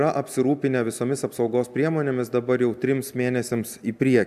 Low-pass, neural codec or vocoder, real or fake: 14.4 kHz; none; real